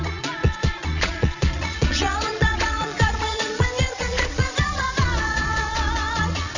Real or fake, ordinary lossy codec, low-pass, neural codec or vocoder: fake; none; 7.2 kHz; vocoder, 22.05 kHz, 80 mel bands, Vocos